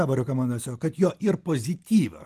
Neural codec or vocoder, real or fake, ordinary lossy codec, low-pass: none; real; Opus, 24 kbps; 14.4 kHz